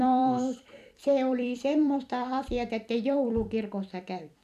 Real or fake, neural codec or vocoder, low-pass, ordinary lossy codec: fake; vocoder, 44.1 kHz, 128 mel bands every 256 samples, BigVGAN v2; 14.4 kHz; none